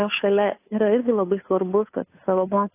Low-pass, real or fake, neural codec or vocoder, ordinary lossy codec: 3.6 kHz; fake; codec, 16 kHz, 2 kbps, FunCodec, trained on Chinese and English, 25 frames a second; AAC, 24 kbps